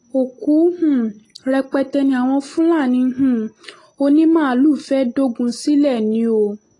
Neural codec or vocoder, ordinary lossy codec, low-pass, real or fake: none; AAC, 32 kbps; 10.8 kHz; real